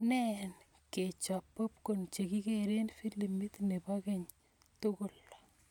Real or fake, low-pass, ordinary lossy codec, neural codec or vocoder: real; 19.8 kHz; none; none